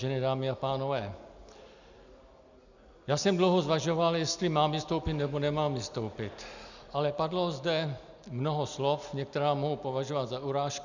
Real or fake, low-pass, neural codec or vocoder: real; 7.2 kHz; none